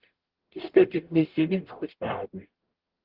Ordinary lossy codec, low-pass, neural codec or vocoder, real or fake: Opus, 16 kbps; 5.4 kHz; codec, 44.1 kHz, 0.9 kbps, DAC; fake